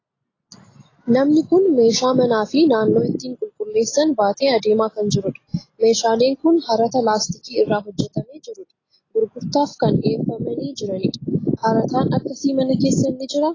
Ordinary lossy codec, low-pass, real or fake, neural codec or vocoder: AAC, 32 kbps; 7.2 kHz; real; none